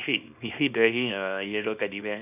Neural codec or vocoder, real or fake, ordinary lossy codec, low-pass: codec, 24 kHz, 0.9 kbps, WavTokenizer, small release; fake; none; 3.6 kHz